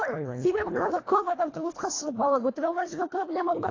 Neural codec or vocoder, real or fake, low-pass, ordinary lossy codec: codec, 24 kHz, 1.5 kbps, HILCodec; fake; 7.2 kHz; AAC, 32 kbps